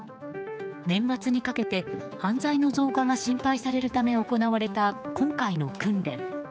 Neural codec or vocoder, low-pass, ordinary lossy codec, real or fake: codec, 16 kHz, 4 kbps, X-Codec, HuBERT features, trained on general audio; none; none; fake